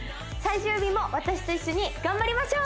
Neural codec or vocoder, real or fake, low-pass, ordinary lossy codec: none; real; none; none